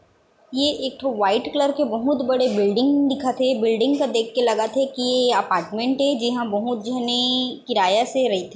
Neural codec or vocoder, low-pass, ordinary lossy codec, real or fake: none; none; none; real